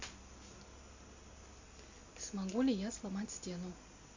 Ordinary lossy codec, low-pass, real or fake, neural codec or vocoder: AAC, 48 kbps; 7.2 kHz; real; none